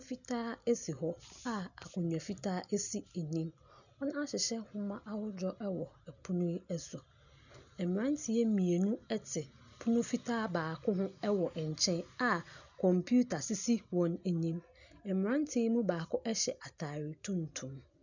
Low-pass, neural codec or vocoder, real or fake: 7.2 kHz; none; real